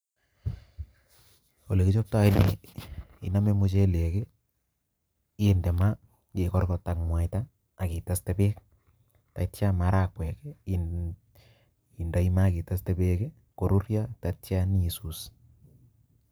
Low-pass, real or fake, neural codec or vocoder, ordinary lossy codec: none; fake; vocoder, 44.1 kHz, 128 mel bands every 512 samples, BigVGAN v2; none